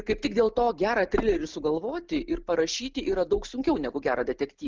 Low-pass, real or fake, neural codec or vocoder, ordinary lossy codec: 7.2 kHz; real; none; Opus, 32 kbps